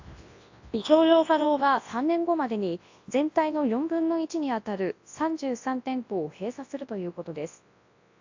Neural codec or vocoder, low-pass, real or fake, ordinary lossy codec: codec, 24 kHz, 0.9 kbps, WavTokenizer, large speech release; 7.2 kHz; fake; AAC, 48 kbps